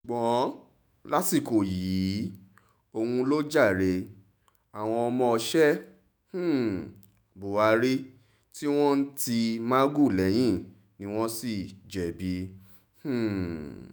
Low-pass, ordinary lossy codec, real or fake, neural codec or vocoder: none; none; fake; autoencoder, 48 kHz, 128 numbers a frame, DAC-VAE, trained on Japanese speech